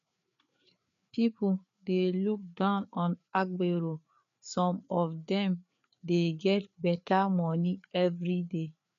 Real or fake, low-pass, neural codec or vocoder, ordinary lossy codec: fake; 7.2 kHz; codec, 16 kHz, 4 kbps, FreqCodec, larger model; MP3, 96 kbps